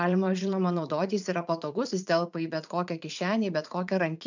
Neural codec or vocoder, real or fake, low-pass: vocoder, 44.1 kHz, 80 mel bands, Vocos; fake; 7.2 kHz